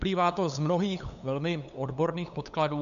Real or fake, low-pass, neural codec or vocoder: fake; 7.2 kHz; codec, 16 kHz, 8 kbps, FunCodec, trained on LibriTTS, 25 frames a second